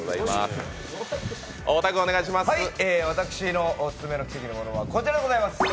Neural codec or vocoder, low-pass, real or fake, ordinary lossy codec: none; none; real; none